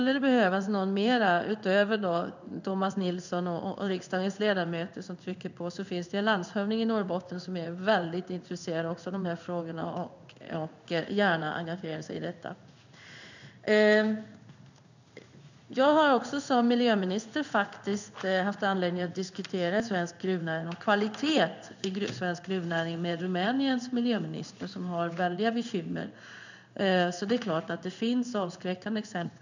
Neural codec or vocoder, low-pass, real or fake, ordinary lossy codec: codec, 16 kHz in and 24 kHz out, 1 kbps, XY-Tokenizer; 7.2 kHz; fake; none